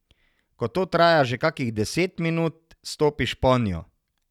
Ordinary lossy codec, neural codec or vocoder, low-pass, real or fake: none; none; 19.8 kHz; real